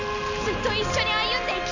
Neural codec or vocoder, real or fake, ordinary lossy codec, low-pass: none; real; none; 7.2 kHz